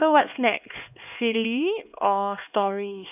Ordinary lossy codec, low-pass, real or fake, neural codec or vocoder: none; 3.6 kHz; fake; codec, 16 kHz, 2 kbps, X-Codec, HuBERT features, trained on LibriSpeech